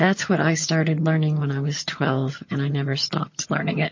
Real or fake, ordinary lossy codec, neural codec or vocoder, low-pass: fake; MP3, 32 kbps; vocoder, 22.05 kHz, 80 mel bands, HiFi-GAN; 7.2 kHz